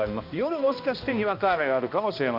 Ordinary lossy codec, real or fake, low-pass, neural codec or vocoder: MP3, 48 kbps; fake; 5.4 kHz; codec, 16 kHz, 1 kbps, X-Codec, HuBERT features, trained on balanced general audio